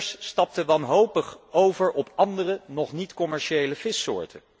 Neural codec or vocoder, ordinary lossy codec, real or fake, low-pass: none; none; real; none